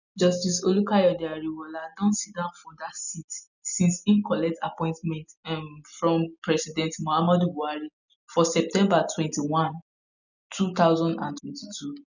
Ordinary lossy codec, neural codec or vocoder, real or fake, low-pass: none; none; real; 7.2 kHz